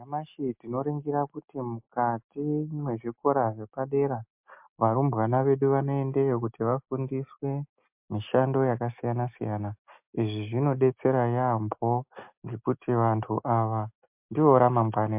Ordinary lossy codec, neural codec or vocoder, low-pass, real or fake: MP3, 32 kbps; none; 3.6 kHz; real